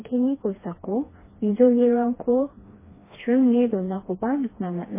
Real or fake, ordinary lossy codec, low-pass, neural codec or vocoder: fake; MP3, 16 kbps; 3.6 kHz; codec, 16 kHz, 2 kbps, FreqCodec, smaller model